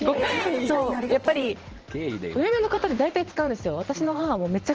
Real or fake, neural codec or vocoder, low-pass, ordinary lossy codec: fake; vocoder, 44.1 kHz, 128 mel bands every 512 samples, BigVGAN v2; 7.2 kHz; Opus, 16 kbps